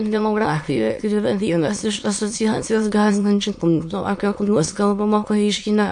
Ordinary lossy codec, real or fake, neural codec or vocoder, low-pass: MP3, 48 kbps; fake; autoencoder, 22.05 kHz, a latent of 192 numbers a frame, VITS, trained on many speakers; 9.9 kHz